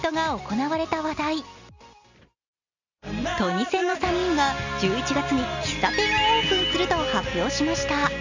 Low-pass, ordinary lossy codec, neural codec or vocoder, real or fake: 7.2 kHz; Opus, 64 kbps; none; real